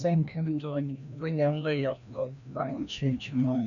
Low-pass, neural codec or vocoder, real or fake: 7.2 kHz; codec, 16 kHz, 1 kbps, FreqCodec, larger model; fake